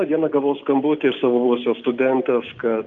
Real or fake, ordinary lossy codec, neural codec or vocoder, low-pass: real; Opus, 24 kbps; none; 7.2 kHz